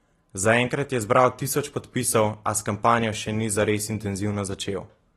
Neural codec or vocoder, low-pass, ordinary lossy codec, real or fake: none; 19.8 kHz; AAC, 32 kbps; real